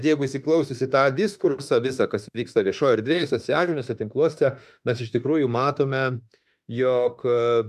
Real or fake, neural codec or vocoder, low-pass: fake; autoencoder, 48 kHz, 32 numbers a frame, DAC-VAE, trained on Japanese speech; 14.4 kHz